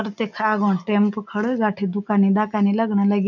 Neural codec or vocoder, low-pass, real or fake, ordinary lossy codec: none; 7.2 kHz; real; none